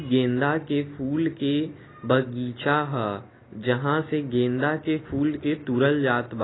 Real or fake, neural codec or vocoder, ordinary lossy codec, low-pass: real; none; AAC, 16 kbps; 7.2 kHz